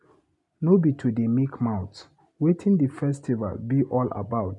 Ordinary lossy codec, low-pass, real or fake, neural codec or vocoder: none; 10.8 kHz; real; none